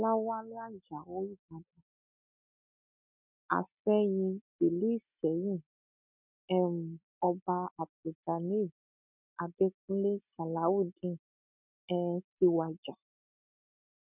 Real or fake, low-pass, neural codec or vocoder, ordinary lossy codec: real; 3.6 kHz; none; none